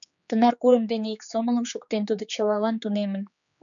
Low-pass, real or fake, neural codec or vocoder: 7.2 kHz; fake; codec, 16 kHz, 4 kbps, X-Codec, HuBERT features, trained on general audio